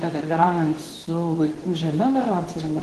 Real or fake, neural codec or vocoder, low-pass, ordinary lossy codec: fake; codec, 24 kHz, 0.9 kbps, WavTokenizer, medium speech release version 2; 10.8 kHz; Opus, 16 kbps